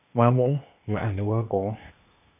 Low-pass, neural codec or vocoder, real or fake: 3.6 kHz; codec, 16 kHz, 0.8 kbps, ZipCodec; fake